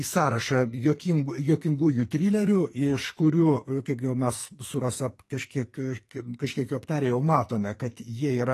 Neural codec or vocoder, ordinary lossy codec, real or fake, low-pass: codec, 44.1 kHz, 2.6 kbps, SNAC; AAC, 48 kbps; fake; 14.4 kHz